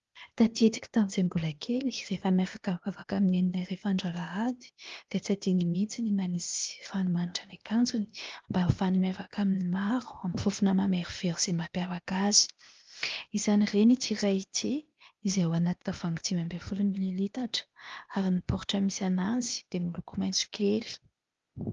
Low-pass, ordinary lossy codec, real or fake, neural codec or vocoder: 7.2 kHz; Opus, 32 kbps; fake; codec, 16 kHz, 0.8 kbps, ZipCodec